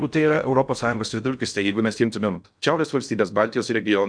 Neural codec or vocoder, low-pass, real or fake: codec, 16 kHz in and 24 kHz out, 0.6 kbps, FocalCodec, streaming, 4096 codes; 9.9 kHz; fake